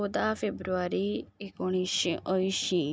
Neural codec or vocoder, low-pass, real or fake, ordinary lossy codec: none; none; real; none